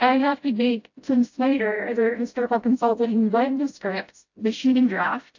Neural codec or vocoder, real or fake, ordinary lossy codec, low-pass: codec, 16 kHz, 0.5 kbps, FreqCodec, smaller model; fake; AAC, 48 kbps; 7.2 kHz